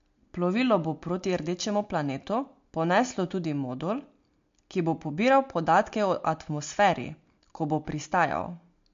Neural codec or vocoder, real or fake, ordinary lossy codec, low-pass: none; real; MP3, 48 kbps; 7.2 kHz